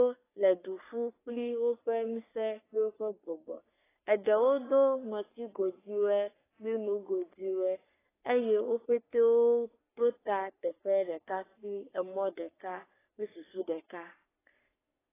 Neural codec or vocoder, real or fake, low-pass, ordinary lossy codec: codec, 44.1 kHz, 3.4 kbps, Pupu-Codec; fake; 3.6 kHz; AAC, 16 kbps